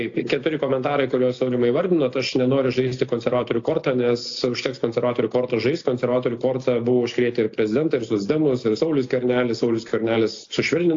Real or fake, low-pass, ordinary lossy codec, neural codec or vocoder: real; 7.2 kHz; AAC, 48 kbps; none